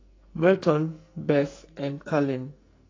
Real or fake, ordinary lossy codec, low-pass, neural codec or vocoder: fake; AAC, 32 kbps; 7.2 kHz; codec, 44.1 kHz, 2.6 kbps, SNAC